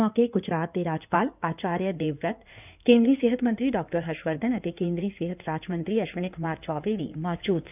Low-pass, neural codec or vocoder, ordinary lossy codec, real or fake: 3.6 kHz; codec, 16 kHz in and 24 kHz out, 2.2 kbps, FireRedTTS-2 codec; none; fake